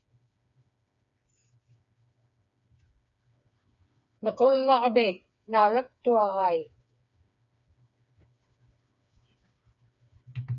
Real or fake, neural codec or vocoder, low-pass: fake; codec, 16 kHz, 4 kbps, FreqCodec, smaller model; 7.2 kHz